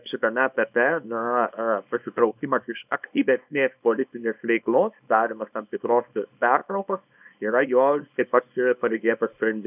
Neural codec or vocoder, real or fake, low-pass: codec, 24 kHz, 0.9 kbps, WavTokenizer, small release; fake; 3.6 kHz